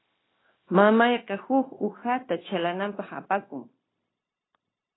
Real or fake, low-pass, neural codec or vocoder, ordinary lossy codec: fake; 7.2 kHz; codec, 16 kHz, 0.9 kbps, LongCat-Audio-Codec; AAC, 16 kbps